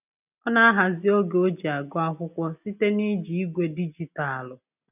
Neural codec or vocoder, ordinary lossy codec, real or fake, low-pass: none; none; real; 3.6 kHz